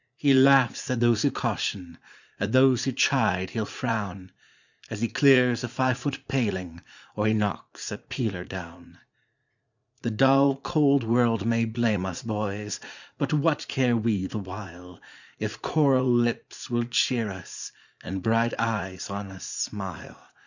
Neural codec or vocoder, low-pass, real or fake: vocoder, 44.1 kHz, 80 mel bands, Vocos; 7.2 kHz; fake